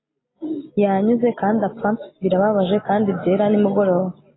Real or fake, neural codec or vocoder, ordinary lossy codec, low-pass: real; none; AAC, 16 kbps; 7.2 kHz